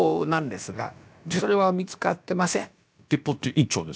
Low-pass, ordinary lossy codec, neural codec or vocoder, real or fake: none; none; codec, 16 kHz, about 1 kbps, DyCAST, with the encoder's durations; fake